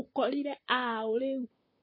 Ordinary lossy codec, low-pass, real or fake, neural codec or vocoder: MP3, 24 kbps; 5.4 kHz; fake; vocoder, 44.1 kHz, 128 mel bands, Pupu-Vocoder